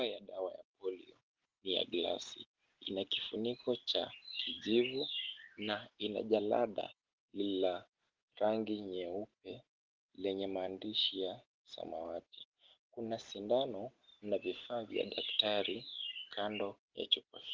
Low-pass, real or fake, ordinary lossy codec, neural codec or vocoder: 7.2 kHz; real; Opus, 16 kbps; none